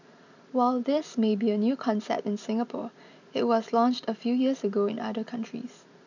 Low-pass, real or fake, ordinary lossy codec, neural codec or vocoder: 7.2 kHz; fake; none; vocoder, 44.1 kHz, 128 mel bands every 256 samples, BigVGAN v2